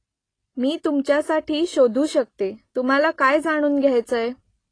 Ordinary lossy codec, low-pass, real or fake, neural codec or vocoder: AAC, 32 kbps; 9.9 kHz; real; none